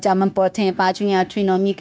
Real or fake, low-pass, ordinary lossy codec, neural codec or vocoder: fake; none; none; codec, 16 kHz, 0.9 kbps, LongCat-Audio-Codec